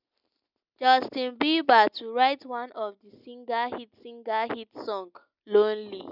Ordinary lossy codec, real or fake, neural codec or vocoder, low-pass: none; real; none; 5.4 kHz